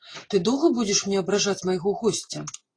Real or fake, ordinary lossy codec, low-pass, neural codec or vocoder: real; AAC, 32 kbps; 9.9 kHz; none